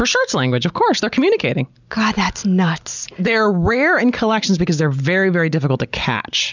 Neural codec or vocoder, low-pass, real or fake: none; 7.2 kHz; real